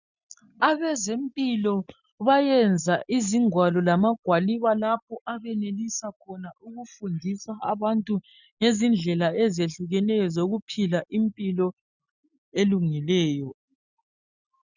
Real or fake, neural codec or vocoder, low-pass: real; none; 7.2 kHz